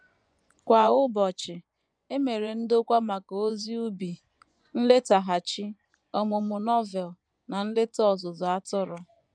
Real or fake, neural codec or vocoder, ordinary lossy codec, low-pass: fake; vocoder, 24 kHz, 100 mel bands, Vocos; none; 9.9 kHz